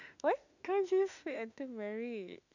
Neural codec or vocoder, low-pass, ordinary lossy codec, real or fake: autoencoder, 48 kHz, 32 numbers a frame, DAC-VAE, trained on Japanese speech; 7.2 kHz; none; fake